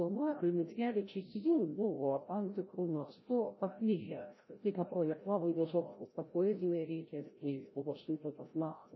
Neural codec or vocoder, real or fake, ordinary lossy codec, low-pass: codec, 16 kHz, 0.5 kbps, FreqCodec, larger model; fake; MP3, 24 kbps; 7.2 kHz